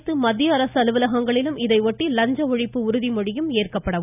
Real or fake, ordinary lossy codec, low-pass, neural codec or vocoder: real; none; 3.6 kHz; none